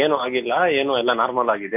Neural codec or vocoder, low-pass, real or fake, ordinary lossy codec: none; 3.6 kHz; real; none